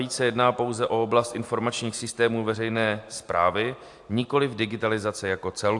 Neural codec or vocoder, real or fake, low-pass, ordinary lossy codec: none; real; 10.8 kHz; AAC, 64 kbps